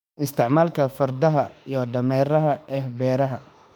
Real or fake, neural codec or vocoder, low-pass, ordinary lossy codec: fake; autoencoder, 48 kHz, 32 numbers a frame, DAC-VAE, trained on Japanese speech; 19.8 kHz; none